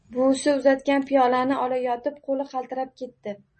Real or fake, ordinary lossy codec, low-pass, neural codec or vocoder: real; MP3, 32 kbps; 10.8 kHz; none